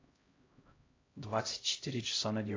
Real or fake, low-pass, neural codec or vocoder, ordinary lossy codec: fake; 7.2 kHz; codec, 16 kHz, 0.5 kbps, X-Codec, HuBERT features, trained on LibriSpeech; AAC, 32 kbps